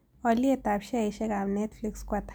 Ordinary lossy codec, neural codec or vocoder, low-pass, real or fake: none; none; none; real